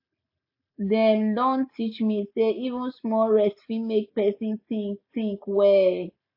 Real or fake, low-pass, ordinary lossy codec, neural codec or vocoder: real; 5.4 kHz; AAC, 48 kbps; none